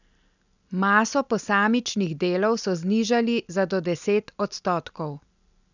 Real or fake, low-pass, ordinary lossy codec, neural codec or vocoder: real; 7.2 kHz; none; none